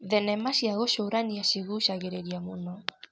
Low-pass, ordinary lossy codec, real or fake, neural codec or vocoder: none; none; real; none